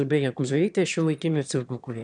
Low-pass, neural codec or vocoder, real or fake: 9.9 kHz; autoencoder, 22.05 kHz, a latent of 192 numbers a frame, VITS, trained on one speaker; fake